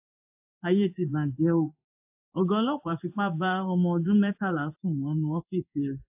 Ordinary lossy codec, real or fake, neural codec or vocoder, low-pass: AAC, 32 kbps; fake; codec, 16 kHz in and 24 kHz out, 1 kbps, XY-Tokenizer; 3.6 kHz